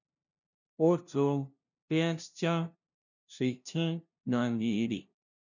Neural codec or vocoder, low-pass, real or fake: codec, 16 kHz, 0.5 kbps, FunCodec, trained on LibriTTS, 25 frames a second; 7.2 kHz; fake